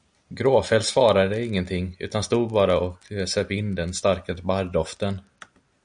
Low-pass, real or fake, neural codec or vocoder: 9.9 kHz; real; none